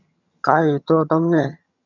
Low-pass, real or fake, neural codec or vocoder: 7.2 kHz; fake; vocoder, 22.05 kHz, 80 mel bands, HiFi-GAN